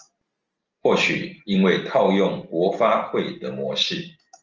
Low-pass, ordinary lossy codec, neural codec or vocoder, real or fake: 7.2 kHz; Opus, 24 kbps; none; real